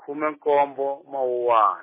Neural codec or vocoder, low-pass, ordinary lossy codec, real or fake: none; 3.6 kHz; MP3, 16 kbps; real